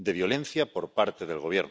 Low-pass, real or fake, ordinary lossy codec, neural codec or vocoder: none; real; none; none